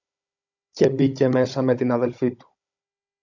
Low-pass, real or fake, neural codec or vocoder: 7.2 kHz; fake; codec, 16 kHz, 16 kbps, FunCodec, trained on Chinese and English, 50 frames a second